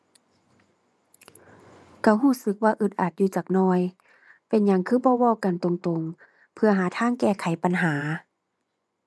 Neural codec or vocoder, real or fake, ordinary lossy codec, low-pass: vocoder, 24 kHz, 100 mel bands, Vocos; fake; none; none